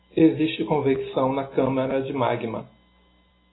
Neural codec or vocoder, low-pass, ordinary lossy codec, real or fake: none; 7.2 kHz; AAC, 16 kbps; real